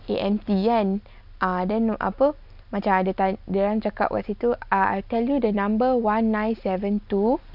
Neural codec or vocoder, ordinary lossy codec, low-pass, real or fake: none; none; 5.4 kHz; real